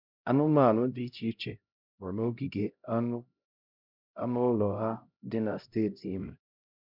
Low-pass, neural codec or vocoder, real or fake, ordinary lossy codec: 5.4 kHz; codec, 16 kHz, 0.5 kbps, X-Codec, HuBERT features, trained on LibriSpeech; fake; none